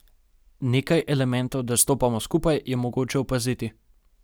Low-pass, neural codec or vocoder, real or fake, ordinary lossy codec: none; none; real; none